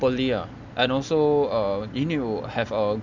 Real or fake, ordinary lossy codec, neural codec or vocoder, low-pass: real; none; none; 7.2 kHz